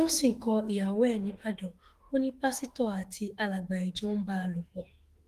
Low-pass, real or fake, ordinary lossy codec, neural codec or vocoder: 14.4 kHz; fake; Opus, 24 kbps; autoencoder, 48 kHz, 32 numbers a frame, DAC-VAE, trained on Japanese speech